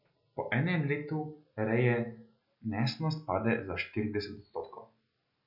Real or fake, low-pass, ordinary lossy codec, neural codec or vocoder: real; 5.4 kHz; none; none